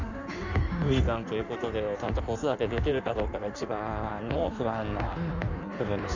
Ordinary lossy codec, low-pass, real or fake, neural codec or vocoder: none; 7.2 kHz; fake; codec, 16 kHz in and 24 kHz out, 1.1 kbps, FireRedTTS-2 codec